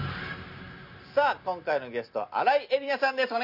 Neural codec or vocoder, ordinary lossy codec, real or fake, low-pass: none; none; real; 5.4 kHz